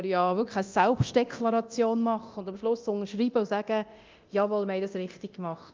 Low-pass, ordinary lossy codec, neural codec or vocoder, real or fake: 7.2 kHz; Opus, 24 kbps; codec, 24 kHz, 0.9 kbps, DualCodec; fake